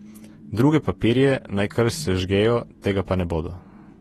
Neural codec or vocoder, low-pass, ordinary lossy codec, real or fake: vocoder, 48 kHz, 128 mel bands, Vocos; 19.8 kHz; AAC, 32 kbps; fake